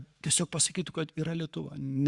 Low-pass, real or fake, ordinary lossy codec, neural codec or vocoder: 10.8 kHz; fake; Opus, 64 kbps; codec, 44.1 kHz, 7.8 kbps, Pupu-Codec